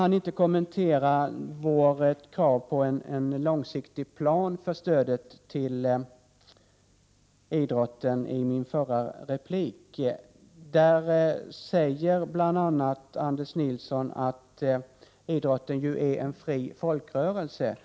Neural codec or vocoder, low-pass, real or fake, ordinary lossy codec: none; none; real; none